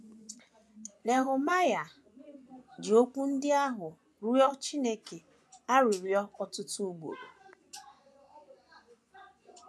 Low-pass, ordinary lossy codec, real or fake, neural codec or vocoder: none; none; fake; vocoder, 24 kHz, 100 mel bands, Vocos